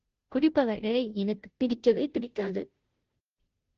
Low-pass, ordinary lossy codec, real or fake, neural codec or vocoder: 7.2 kHz; Opus, 16 kbps; fake; codec, 16 kHz, 0.5 kbps, FunCodec, trained on Chinese and English, 25 frames a second